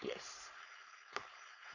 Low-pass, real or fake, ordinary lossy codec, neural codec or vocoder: 7.2 kHz; fake; none; codec, 16 kHz, 4.8 kbps, FACodec